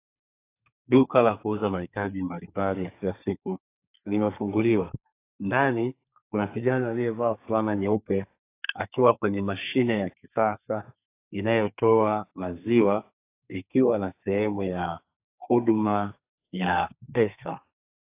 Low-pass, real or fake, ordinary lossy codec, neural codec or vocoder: 3.6 kHz; fake; AAC, 24 kbps; codec, 32 kHz, 1.9 kbps, SNAC